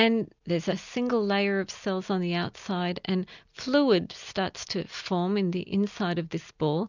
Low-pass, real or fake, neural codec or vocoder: 7.2 kHz; real; none